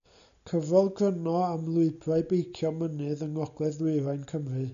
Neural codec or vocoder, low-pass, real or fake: none; 7.2 kHz; real